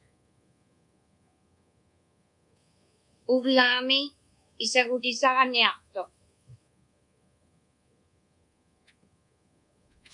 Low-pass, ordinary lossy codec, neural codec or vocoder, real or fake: 10.8 kHz; MP3, 64 kbps; codec, 24 kHz, 1.2 kbps, DualCodec; fake